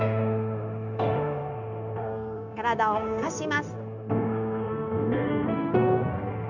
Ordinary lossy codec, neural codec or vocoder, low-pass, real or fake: none; codec, 16 kHz, 0.9 kbps, LongCat-Audio-Codec; 7.2 kHz; fake